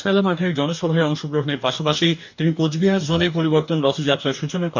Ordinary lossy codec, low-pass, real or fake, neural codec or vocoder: none; 7.2 kHz; fake; codec, 44.1 kHz, 2.6 kbps, DAC